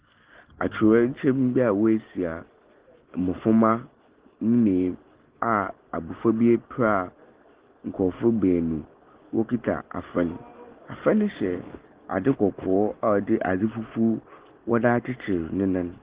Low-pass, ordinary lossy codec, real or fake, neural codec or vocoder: 3.6 kHz; Opus, 16 kbps; real; none